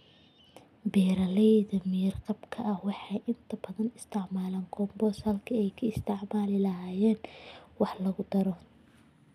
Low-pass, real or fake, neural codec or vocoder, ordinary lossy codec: 14.4 kHz; real; none; none